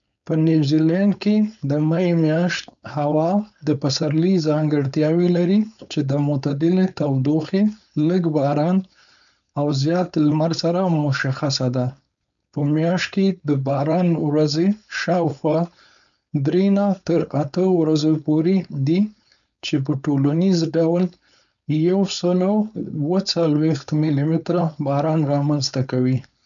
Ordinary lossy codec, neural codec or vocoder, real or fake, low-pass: none; codec, 16 kHz, 4.8 kbps, FACodec; fake; 7.2 kHz